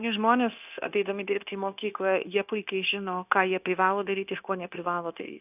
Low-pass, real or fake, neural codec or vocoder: 3.6 kHz; fake; codec, 16 kHz, 0.9 kbps, LongCat-Audio-Codec